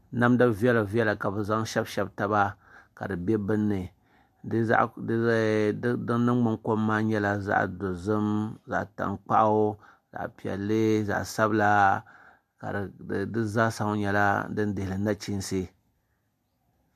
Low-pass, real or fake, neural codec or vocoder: 14.4 kHz; real; none